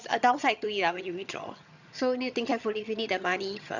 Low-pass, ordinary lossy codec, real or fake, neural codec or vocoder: 7.2 kHz; none; fake; vocoder, 22.05 kHz, 80 mel bands, HiFi-GAN